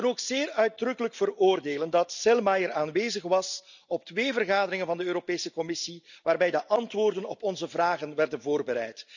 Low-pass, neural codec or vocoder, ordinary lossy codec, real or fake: 7.2 kHz; none; none; real